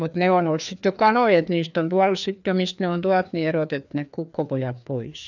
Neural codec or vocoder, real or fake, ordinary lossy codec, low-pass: codec, 16 kHz, 2 kbps, FreqCodec, larger model; fake; none; 7.2 kHz